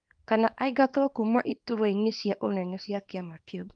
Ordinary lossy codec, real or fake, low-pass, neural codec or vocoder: Opus, 32 kbps; fake; 9.9 kHz; codec, 24 kHz, 0.9 kbps, WavTokenizer, small release